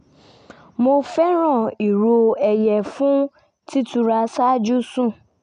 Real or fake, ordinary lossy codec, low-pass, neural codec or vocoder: real; none; 9.9 kHz; none